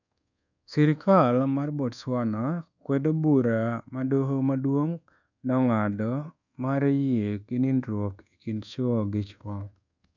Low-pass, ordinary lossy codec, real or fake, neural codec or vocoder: 7.2 kHz; none; fake; codec, 24 kHz, 1.2 kbps, DualCodec